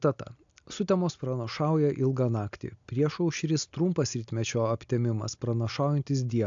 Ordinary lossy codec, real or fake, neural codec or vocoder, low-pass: AAC, 64 kbps; real; none; 7.2 kHz